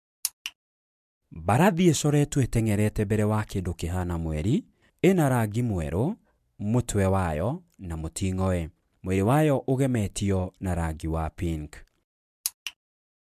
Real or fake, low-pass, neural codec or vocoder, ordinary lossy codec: real; 14.4 kHz; none; MP3, 96 kbps